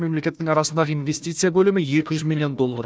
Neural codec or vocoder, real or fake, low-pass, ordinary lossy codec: codec, 16 kHz, 1 kbps, FunCodec, trained on Chinese and English, 50 frames a second; fake; none; none